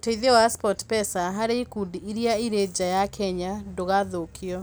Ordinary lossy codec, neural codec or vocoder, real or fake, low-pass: none; none; real; none